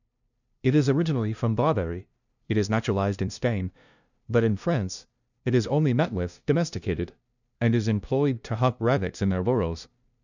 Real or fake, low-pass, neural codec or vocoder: fake; 7.2 kHz; codec, 16 kHz, 0.5 kbps, FunCodec, trained on LibriTTS, 25 frames a second